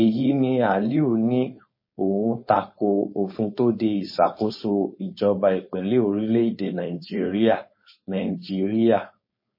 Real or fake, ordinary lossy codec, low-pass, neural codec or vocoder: fake; MP3, 24 kbps; 5.4 kHz; codec, 16 kHz, 4.8 kbps, FACodec